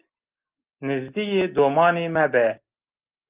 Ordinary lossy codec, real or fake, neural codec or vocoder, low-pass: Opus, 32 kbps; real; none; 3.6 kHz